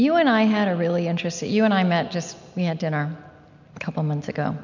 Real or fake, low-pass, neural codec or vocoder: real; 7.2 kHz; none